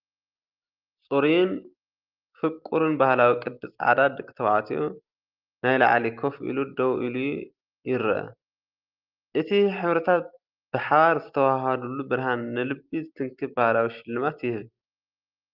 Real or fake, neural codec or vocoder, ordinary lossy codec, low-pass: real; none; Opus, 32 kbps; 5.4 kHz